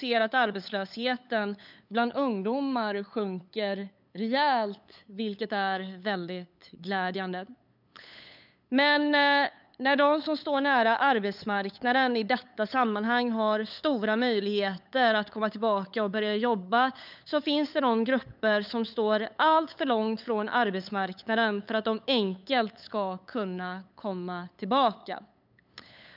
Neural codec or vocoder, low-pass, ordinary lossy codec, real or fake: codec, 16 kHz, 8 kbps, FunCodec, trained on LibriTTS, 25 frames a second; 5.4 kHz; none; fake